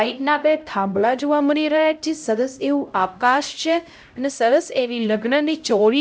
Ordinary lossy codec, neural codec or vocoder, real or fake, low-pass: none; codec, 16 kHz, 0.5 kbps, X-Codec, HuBERT features, trained on LibriSpeech; fake; none